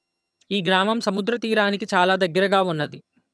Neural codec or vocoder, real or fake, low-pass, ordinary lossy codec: vocoder, 22.05 kHz, 80 mel bands, HiFi-GAN; fake; none; none